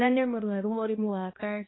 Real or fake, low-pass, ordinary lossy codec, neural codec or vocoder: fake; 7.2 kHz; AAC, 16 kbps; codec, 16 kHz, 1 kbps, X-Codec, HuBERT features, trained on balanced general audio